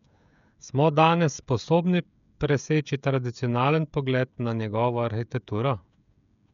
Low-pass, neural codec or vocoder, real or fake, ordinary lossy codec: 7.2 kHz; codec, 16 kHz, 16 kbps, FreqCodec, smaller model; fake; none